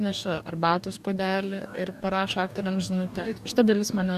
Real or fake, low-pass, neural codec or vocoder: fake; 14.4 kHz; codec, 44.1 kHz, 2.6 kbps, DAC